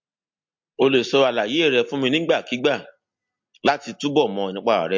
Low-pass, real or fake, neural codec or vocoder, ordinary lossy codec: 7.2 kHz; real; none; MP3, 48 kbps